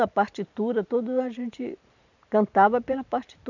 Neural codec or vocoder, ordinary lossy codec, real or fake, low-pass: vocoder, 44.1 kHz, 128 mel bands every 512 samples, BigVGAN v2; none; fake; 7.2 kHz